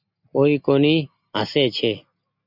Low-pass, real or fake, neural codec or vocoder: 5.4 kHz; real; none